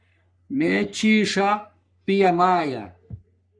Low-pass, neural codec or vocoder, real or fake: 9.9 kHz; codec, 44.1 kHz, 3.4 kbps, Pupu-Codec; fake